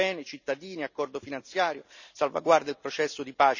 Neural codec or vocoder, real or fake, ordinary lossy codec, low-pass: none; real; none; 7.2 kHz